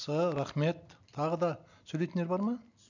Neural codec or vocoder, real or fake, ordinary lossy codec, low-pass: none; real; none; 7.2 kHz